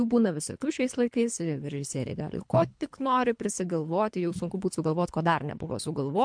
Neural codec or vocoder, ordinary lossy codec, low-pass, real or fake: codec, 24 kHz, 3 kbps, HILCodec; MP3, 64 kbps; 9.9 kHz; fake